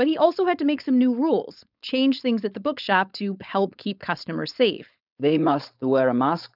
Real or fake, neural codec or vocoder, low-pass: fake; codec, 16 kHz, 4.8 kbps, FACodec; 5.4 kHz